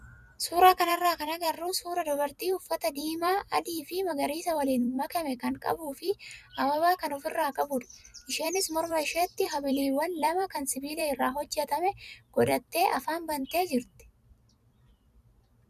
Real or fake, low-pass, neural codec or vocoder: fake; 14.4 kHz; vocoder, 44.1 kHz, 128 mel bands every 256 samples, BigVGAN v2